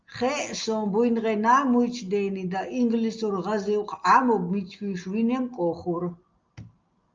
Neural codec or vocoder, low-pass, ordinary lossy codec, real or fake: none; 7.2 kHz; Opus, 24 kbps; real